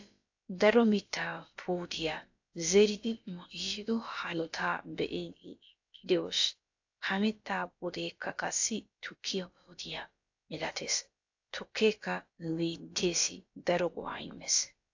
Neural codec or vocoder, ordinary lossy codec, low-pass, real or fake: codec, 16 kHz, about 1 kbps, DyCAST, with the encoder's durations; AAC, 48 kbps; 7.2 kHz; fake